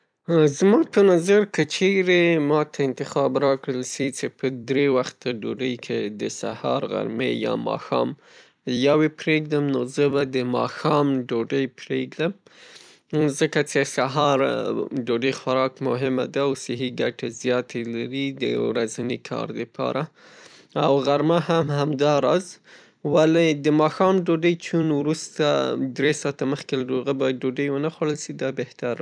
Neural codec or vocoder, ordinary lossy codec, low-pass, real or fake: vocoder, 44.1 kHz, 128 mel bands every 256 samples, BigVGAN v2; none; 9.9 kHz; fake